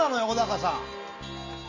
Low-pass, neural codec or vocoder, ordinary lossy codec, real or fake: 7.2 kHz; none; none; real